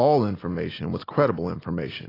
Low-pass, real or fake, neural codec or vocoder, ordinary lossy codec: 5.4 kHz; real; none; AAC, 24 kbps